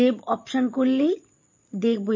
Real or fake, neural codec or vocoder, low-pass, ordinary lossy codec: fake; vocoder, 22.05 kHz, 80 mel bands, Vocos; 7.2 kHz; MP3, 32 kbps